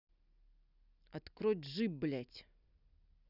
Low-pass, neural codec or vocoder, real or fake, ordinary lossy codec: 5.4 kHz; none; real; none